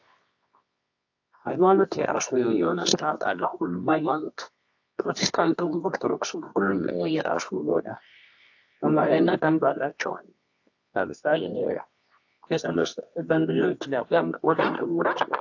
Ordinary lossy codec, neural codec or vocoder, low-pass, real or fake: AAC, 48 kbps; codec, 24 kHz, 0.9 kbps, WavTokenizer, medium music audio release; 7.2 kHz; fake